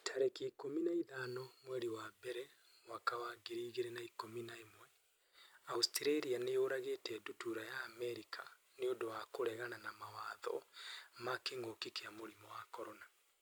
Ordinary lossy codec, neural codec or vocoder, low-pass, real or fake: none; none; none; real